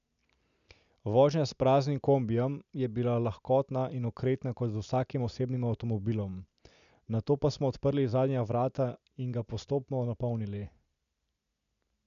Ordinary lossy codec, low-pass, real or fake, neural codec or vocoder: none; 7.2 kHz; real; none